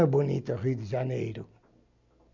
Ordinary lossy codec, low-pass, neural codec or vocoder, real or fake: MP3, 64 kbps; 7.2 kHz; none; real